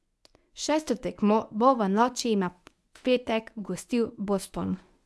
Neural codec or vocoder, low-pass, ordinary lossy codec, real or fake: codec, 24 kHz, 0.9 kbps, WavTokenizer, medium speech release version 1; none; none; fake